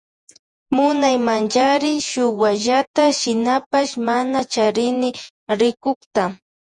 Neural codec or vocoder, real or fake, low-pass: vocoder, 48 kHz, 128 mel bands, Vocos; fake; 10.8 kHz